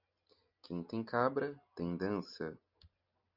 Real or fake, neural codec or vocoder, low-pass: real; none; 5.4 kHz